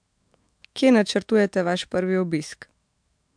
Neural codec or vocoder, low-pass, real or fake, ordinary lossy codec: autoencoder, 48 kHz, 128 numbers a frame, DAC-VAE, trained on Japanese speech; 9.9 kHz; fake; MP3, 64 kbps